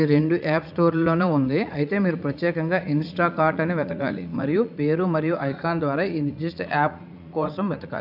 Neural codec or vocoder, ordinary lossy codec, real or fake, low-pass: vocoder, 44.1 kHz, 80 mel bands, Vocos; AAC, 48 kbps; fake; 5.4 kHz